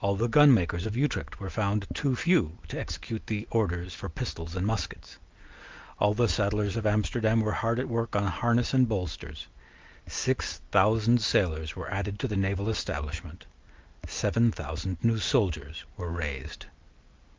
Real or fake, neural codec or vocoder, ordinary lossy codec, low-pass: real; none; Opus, 24 kbps; 7.2 kHz